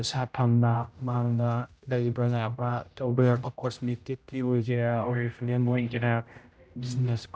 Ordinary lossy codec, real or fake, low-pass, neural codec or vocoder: none; fake; none; codec, 16 kHz, 0.5 kbps, X-Codec, HuBERT features, trained on general audio